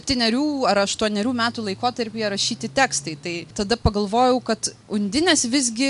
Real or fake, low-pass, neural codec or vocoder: real; 10.8 kHz; none